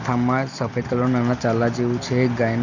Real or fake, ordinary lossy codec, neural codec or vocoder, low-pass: real; none; none; 7.2 kHz